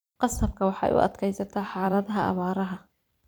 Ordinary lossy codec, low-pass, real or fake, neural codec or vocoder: none; none; fake; vocoder, 44.1 kHz, 128 mel bands every 256 samples, BigVGAN v2